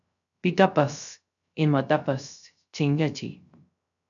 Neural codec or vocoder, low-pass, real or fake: codec, 16 kHz, 0.3 kbps, FocalCodec; 7.2 kHz; fake